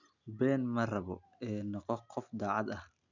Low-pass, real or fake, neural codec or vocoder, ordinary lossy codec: 7.2 kHz; real; none; none